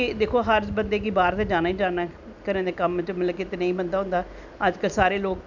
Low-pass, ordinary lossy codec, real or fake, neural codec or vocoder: 7.2 kHz; none; real; none